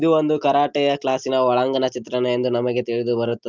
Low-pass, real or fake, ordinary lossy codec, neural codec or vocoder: 7.2 kHz; real; Opus, 32 kbps; none